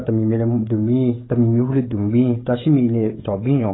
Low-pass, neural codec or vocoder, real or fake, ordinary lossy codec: 7.2 kHz; codec, 16 kHz, 16 kbps, FreqCodec, smaller model; fake; AAC, 16 kbps